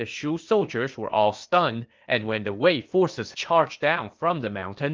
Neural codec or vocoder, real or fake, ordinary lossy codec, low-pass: codec, 16 kHz, 0.8 kbps, ZipCodec; fake; Opus, 24 kbps; 7.2 kHz